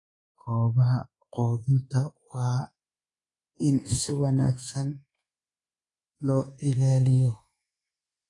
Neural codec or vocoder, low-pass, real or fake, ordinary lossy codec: codec, 24 kHz, 1.2 kbps, DualCodec; 10.8 kHz; fake; AAC, 32 kbps